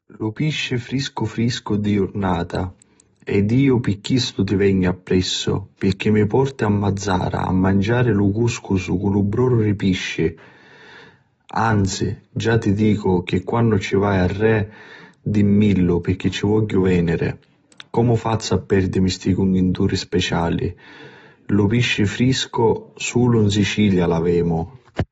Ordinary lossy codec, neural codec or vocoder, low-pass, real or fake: AAC, 24 kbps; none; 14.4 kHz; real